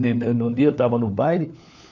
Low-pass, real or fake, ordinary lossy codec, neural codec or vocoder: 7.2 kHz; fake; none; codec, 16 kHz, 4 kbps, FunCodec, trained on LibriTTS, 50 frames a second